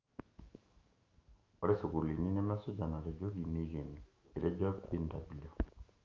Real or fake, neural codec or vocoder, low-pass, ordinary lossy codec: fake; autoencoder, 48 kHz, 128 numbers a frame, DAC-VAE, trained on Japanese speech; 7.2 kHz; Opus, 32 kbps